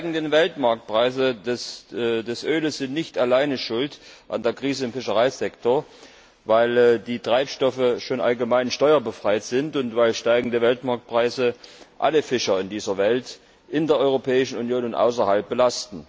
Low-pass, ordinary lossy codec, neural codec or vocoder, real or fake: none; none; none; real